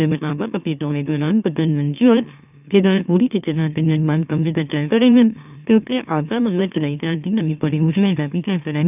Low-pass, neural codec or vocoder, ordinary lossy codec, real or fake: 3.6 kHz; autoencoder, 44.1 kHz, a latent of 192 numbers a frame, MeloTTS; none; fake